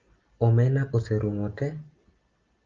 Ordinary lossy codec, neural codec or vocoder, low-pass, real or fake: Opus, 24 kbps; none; 7.2 kHz; real